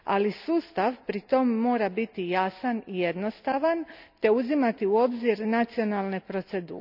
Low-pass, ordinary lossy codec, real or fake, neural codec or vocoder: 5.4 kHz; none; real; none